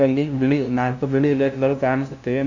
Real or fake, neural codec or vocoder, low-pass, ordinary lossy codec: fake; codec, 16 kHz, 0.5 kbps, FunCodec, trained on LibriTTS, 25 frames a second; 7.2 kHz; none